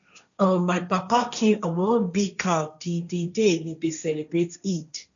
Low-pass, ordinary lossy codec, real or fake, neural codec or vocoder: 7.2 kHz; none; fake; codec, 16 kHz, 1.1 kbps, Voila-Tokenizer